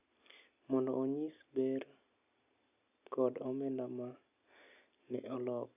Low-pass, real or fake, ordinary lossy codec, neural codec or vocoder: 3.6 kHz; real; none; none